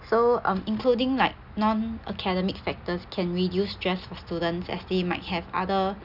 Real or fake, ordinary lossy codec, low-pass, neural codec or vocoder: real; none; 5.4 kHz; none